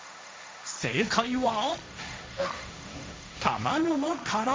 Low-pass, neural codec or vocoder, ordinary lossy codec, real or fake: none; codec, 16 kHz, 1.1 kbps, Voila-Tokenizer; none; fake